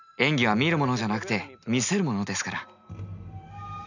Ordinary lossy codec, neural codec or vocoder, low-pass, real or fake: none; none; 7.2 kHz; real